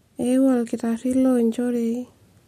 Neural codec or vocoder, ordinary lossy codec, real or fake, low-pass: none; MP3, 64 kbps; real; 14.4 kHz